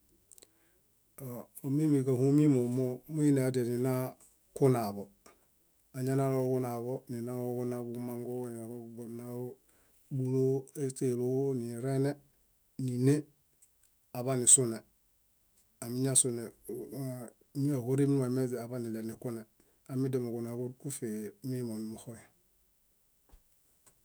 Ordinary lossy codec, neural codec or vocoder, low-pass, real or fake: none; autoencoder, 48 kHz, 128 numbers a frame, DAC-VAE, trained on Japanese speech; none; fake